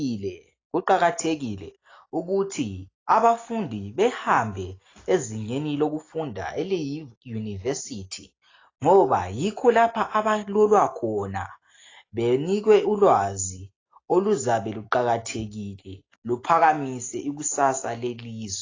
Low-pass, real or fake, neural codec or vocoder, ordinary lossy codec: 7.2 kHz; real; none; AAC, 32 kbps